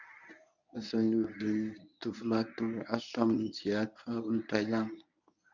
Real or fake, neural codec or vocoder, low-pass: fake; codec, 24 kHz, 0.9 kbps, WavTokenizer, medium speech release version 1; 7.2 kHz